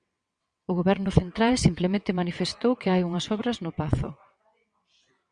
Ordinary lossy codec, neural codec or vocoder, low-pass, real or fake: Opus, 64 kbps; vocoder, 22.05 kHz, 80 mel bands, WaveNeXt; 9.9 kHz; fake